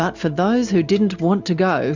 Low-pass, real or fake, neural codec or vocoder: 7.2 kHz; real; none